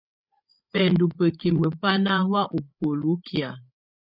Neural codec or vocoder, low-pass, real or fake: codec, 16 kHz, 8 kbps, FreqCodec, larger model; 5.4 kHz; fake